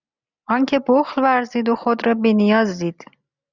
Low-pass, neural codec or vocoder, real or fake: 7.2 kHz; none; real